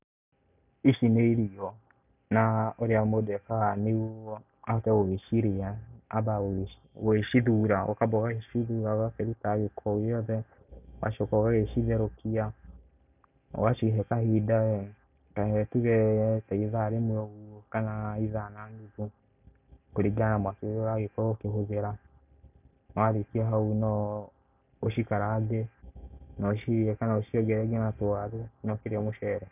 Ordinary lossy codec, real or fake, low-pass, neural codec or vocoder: none; real; 3.6 kHz; none